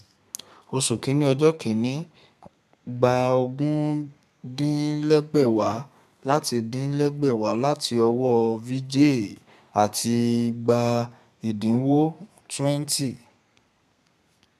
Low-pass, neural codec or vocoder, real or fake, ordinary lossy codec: 14.4 kHz; codec, 32 kHz, 1.9 kbps, SNAC; fake; none